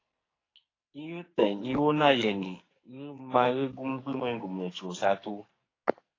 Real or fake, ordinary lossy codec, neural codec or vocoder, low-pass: fake; AAC, 32 kbps; codec, 44.1 kHz, 2.6 kbps, SNAC; 7.2 kHz